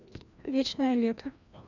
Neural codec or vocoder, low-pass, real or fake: codec, 16 kHz, 1 kbps, FreqCodec, larger model; 7.2 kHz; fake